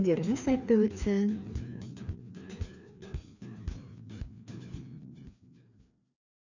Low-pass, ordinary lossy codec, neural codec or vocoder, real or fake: 7.2 kHz; none; codec, 16 kHz, 2 kbps, FreqCodec, larger model; fake